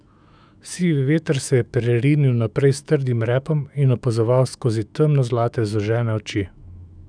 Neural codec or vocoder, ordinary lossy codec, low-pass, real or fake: autoencoder, 48 kHz, 128 numbers a frame, DAC-VAE, trained on Japanese speech; none; 9.9 kHz; fake